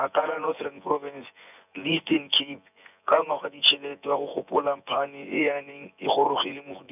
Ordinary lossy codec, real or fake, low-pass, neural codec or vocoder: none; fake; 3.6 kHz; vocoder, 24 kHz, 100 mel bands, Vocos